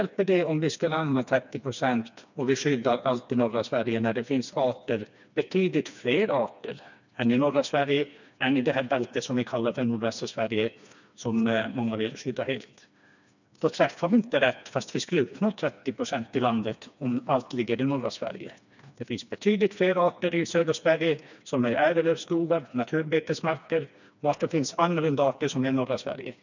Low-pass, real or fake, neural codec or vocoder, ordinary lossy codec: 7.2 kHz; fake; codec, 16 kHz, 2 kbps, FreqCodec, smaller model; none